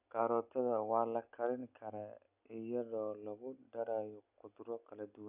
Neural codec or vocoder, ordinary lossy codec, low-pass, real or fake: none; none; 3.6 kHz; real